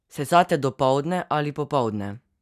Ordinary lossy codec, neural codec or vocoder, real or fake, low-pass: none; none; real; 14.4 kHz